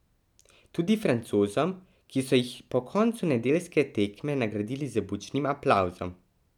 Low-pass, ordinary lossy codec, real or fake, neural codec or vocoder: 19.8 kHz; none; real; none